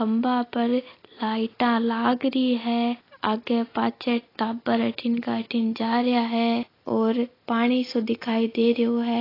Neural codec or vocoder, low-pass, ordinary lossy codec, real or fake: none; 5.4 kHz; AAC, 24 kbps; real